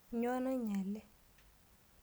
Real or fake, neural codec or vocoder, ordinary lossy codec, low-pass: real; none; none; none